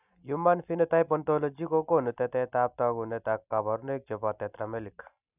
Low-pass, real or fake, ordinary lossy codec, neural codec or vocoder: 3.6 kHz; real; none; none